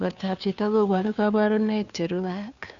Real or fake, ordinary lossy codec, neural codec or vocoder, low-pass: fake; none; codec, 16 kHz, 2 kbps, FunCodec, trained on Chinese and English, 25 frames a second; 7.2 kHz